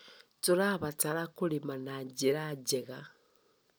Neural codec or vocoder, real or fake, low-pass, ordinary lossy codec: none; real; none; none